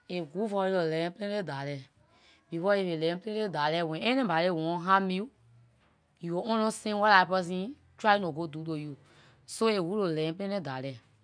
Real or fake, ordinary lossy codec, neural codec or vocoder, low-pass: real; none; none; 9.9 kHz